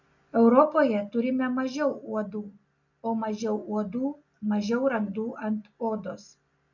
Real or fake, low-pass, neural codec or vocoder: real; 7.2 kHz; none